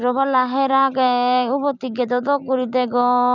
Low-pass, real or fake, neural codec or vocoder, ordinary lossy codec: 7.2 kHz; fake; vocoder, 44.1 kHz, 128 mel bands every 256 samples, BigVGAN v2; none